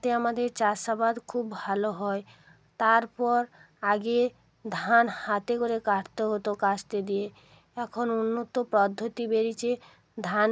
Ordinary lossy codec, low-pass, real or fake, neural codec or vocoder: none; none; real; none